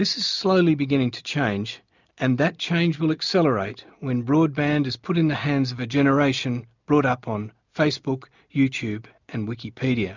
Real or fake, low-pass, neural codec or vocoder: real; 7.2 kHz; none